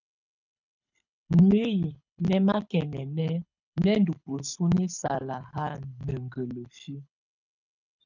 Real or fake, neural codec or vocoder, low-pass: fake; codec, 24 kHz, 6 kbps, HILCodec; 7.2 kHz